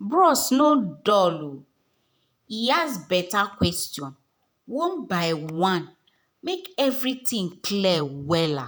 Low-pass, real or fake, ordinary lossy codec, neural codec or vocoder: none; fake; none; vocoder, 48 kHz, 128 mel bands, Vocos